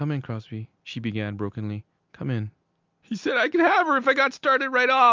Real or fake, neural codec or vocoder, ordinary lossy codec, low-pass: real; none; Opus, 24 kbps; 7.2 kHz